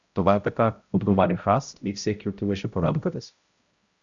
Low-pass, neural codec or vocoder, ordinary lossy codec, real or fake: 7.2 kHz; codec, 16 kHz, 0.5 kbps, X-Codec, HuBERT features, trained on balanced general audio; Opus, 64 kbps; fake